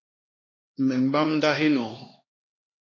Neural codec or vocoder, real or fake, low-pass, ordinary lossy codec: codec, 16 kHz, 2 kbps, X-Codec, HuBERT features, trained on LibriSpeech; fake; 7.2 kHz; AAC, 32 kbps